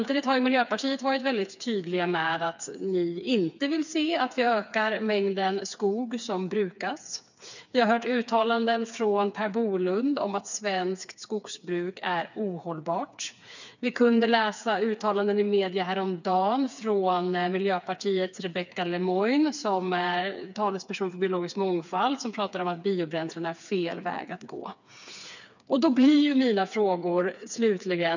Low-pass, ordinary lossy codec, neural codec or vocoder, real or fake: 7.2 kHz; none; codec, 16 kHz, 4 kbps, FreqCodec, smaller model; fake